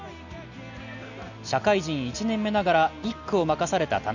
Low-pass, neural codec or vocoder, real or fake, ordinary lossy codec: 7.2 kHz; none; real; none